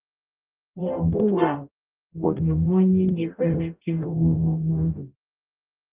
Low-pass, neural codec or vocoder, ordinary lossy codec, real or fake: 3.6 kHz; codec, 44.1 kHz, 0.9 kbps, DAC; Opus, 32 kbps; fake